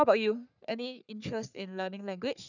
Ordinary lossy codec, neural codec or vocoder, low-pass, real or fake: none; codec, 44.1 kHz, 3.4 kbps, Pupu-Codec; 7.2 kHz; fake